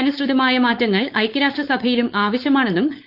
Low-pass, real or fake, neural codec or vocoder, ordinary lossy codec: 5.4 kHz; fake; codec, 16 kHz, 4.8 kbps, FACodec; Opus, 24 kbps